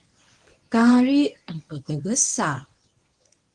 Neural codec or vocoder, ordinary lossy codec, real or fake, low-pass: codec, 24 kHz, 0.9 kbps, WavTokenizer, medium speech release version 1; Opus, 24 kbps; fake; 10.8 kHz